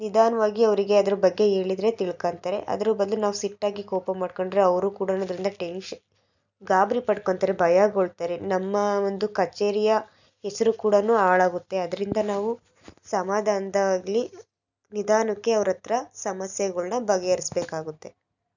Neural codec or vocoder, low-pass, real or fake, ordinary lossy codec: none; 7.2 kHz; real; none